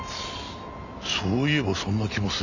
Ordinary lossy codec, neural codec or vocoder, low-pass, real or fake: none; none; 7.2 kHz; real